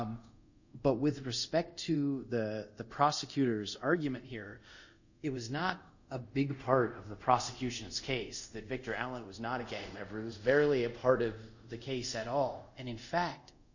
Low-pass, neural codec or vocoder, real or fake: 7.2 kHz; codec, 24 kHz, 0.5 kbps, DualCodec; fake